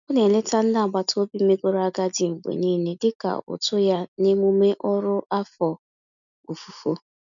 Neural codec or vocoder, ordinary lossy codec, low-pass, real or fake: none; none; 7.2 kHz; real